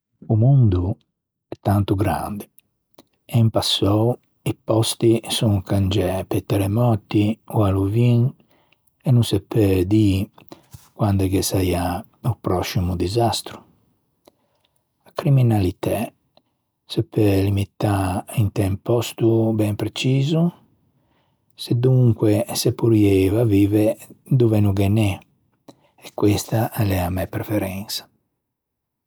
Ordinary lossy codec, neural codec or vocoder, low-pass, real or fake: none; none; none; real